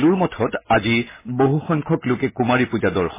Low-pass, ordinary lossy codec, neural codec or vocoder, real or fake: 3.6 kHz; MP3, 16 kbps; none; real